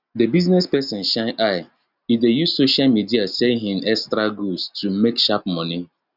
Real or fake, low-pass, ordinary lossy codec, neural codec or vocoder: real; 5.4 kHz; none; none